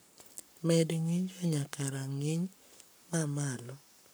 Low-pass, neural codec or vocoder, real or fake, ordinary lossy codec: none; codec, 44.1 kHz, 7.8 kbps, Pupu-Codec; fake; none